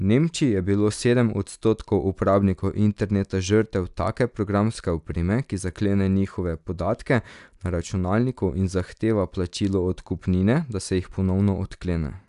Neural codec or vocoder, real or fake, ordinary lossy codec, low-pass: none; real; none; 10.8 kHz